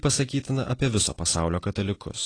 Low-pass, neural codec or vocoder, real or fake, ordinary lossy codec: 9.9 kHz; none; real; AAC, 32 kbps